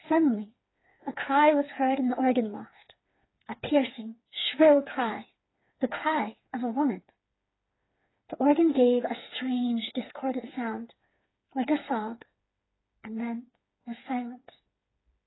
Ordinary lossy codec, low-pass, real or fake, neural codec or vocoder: AAC, 16 kbps; 7.2 kHz; fake; codec, 16 kHz, 4 kbps, FreqCodec, smaller model